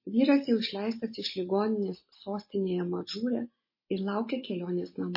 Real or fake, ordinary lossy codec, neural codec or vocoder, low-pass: real; MP3, 24 kbps; none; 5.4 kHz